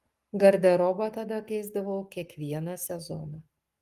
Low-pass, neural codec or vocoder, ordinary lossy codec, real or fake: 14.4 kHz; codec, 44.1 kHz, 7.8 kbps, Pupu-Codec; Opus, 32 kbps; fake